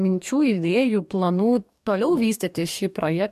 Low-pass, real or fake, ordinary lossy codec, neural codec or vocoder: 14.4 kHz; fake; MP3, 96 kbps; codec, 44.1 kHz, 2.6 kbps, DAC